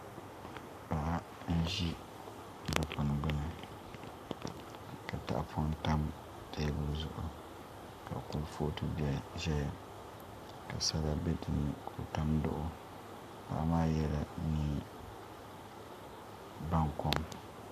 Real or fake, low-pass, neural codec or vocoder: fake; 14.4 kHz; codec, 44.1 kHz, 7.8 kbps, Pupu-Codec